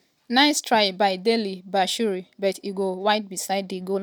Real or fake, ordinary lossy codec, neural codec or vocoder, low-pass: real; none; none; none